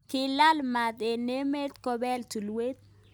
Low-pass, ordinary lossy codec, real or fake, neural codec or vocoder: none; none; real; none